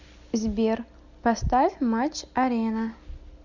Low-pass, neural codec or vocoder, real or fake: 7.2 kHz; none; real